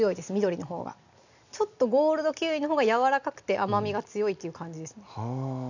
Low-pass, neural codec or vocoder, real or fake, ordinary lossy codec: 7.2 kHz; none; real; none